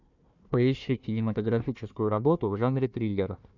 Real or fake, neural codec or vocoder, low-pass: fake; codec, 16 kHz, 1 kbps, FunCodec, trained on Chinese and English, 50 frames a second; 7.2 kHz